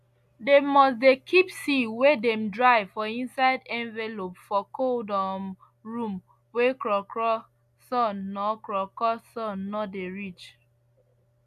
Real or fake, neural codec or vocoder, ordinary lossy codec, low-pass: real; none; AAC, 96 kbps; 14.4 kHz